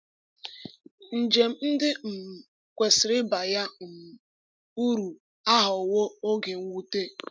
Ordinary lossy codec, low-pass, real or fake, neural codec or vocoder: none; none; real; none